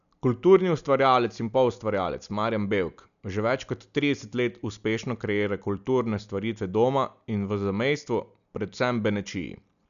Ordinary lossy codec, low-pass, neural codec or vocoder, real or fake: none; 7.2 kHz; none; real